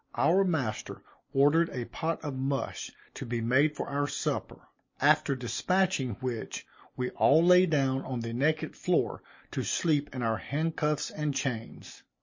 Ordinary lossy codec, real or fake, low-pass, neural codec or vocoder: MP3, 32 kbps; real; 7.2 kHz; none